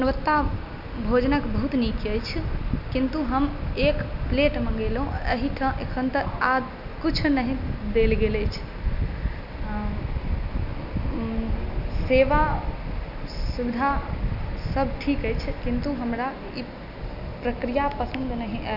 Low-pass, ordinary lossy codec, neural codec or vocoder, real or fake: 5.4 kHz; none; none; real